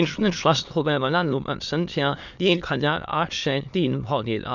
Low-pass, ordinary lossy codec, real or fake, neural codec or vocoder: 7.2 kHz; none; fake; autoencoder, 22.05 kHz, a latent of 192 numbers a frame, VITS, trained on many speakers